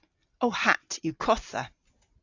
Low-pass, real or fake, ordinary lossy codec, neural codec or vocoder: 7.2 kHz; real; AAC, 48 kbps; none